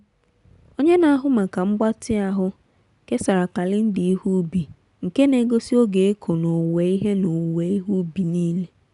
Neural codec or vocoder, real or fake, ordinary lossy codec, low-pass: none; real; none; 10.8 kHz